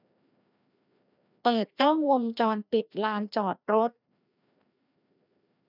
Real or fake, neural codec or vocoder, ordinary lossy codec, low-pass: fake; codec, 16 kHz, 1 kbps, FreqCodec, larger model; none; 5.4 kHz